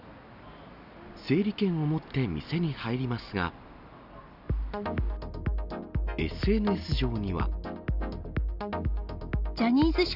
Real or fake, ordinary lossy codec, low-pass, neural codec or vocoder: real; none; 5.4 kHz; none